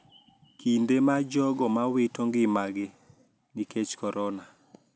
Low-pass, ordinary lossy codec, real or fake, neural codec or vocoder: none; none; real; none